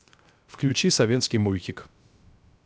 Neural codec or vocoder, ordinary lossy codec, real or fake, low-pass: codec, 16 kHz, 0.3 kbps, FocalCodec; none; fake; none